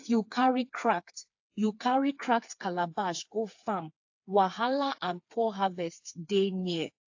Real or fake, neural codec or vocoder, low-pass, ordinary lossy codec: fake; codec, 16 kHz, 4 kbps, FreqCodec, smaller model; 7.2 kHz; AAC, 48 kbps